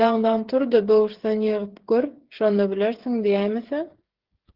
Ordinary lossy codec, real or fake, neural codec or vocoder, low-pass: Opus, 16 kbps; fake; codec, 16 kHz, 8 kbps, FreqCodec, smaller model; 5.4 kHz